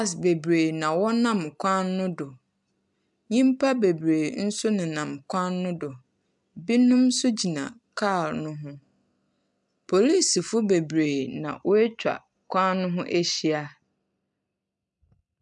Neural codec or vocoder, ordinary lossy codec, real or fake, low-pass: none; MP3, 96 kbps; real; 10.8 kHz